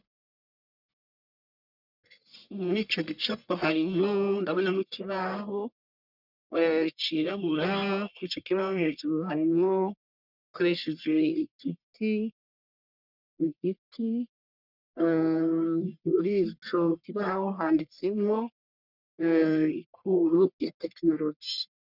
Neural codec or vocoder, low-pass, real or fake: codec, 44.1 kHz, 1.7 kbps, Pupu-Codec; 5.4 kHz; fake